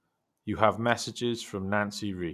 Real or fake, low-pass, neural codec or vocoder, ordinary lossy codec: real; 14.4 kHz; none; none